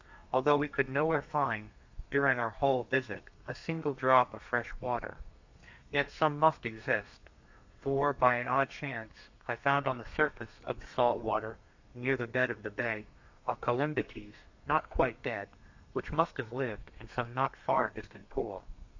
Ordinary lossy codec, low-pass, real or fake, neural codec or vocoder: AAC, 48 kbps; 7.2 kHz; fake; codec, 32 kHz, 1.9 kbps, SNAC